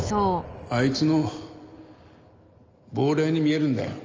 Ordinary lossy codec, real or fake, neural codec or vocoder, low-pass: Opus, 24 kbps; real; none; 7.2 kHz